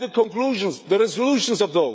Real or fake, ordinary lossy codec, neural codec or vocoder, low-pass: fake; none; codec, 16 kHz, 16 kbps, FreqCodec, smaller model; none